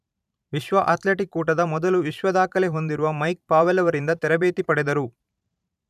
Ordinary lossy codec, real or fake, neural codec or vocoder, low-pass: none; fake; vocoder, 44.1 kHz, 128 mel bands every 512 samples, BigVGAN v2; 14.4 kHz